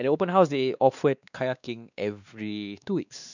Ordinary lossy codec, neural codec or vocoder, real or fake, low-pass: none; codec, 16 kHz, 2 kbps, X-Codec, WavLM features, trained on Multilingual LibriSpeech; fake; 7.2 kHz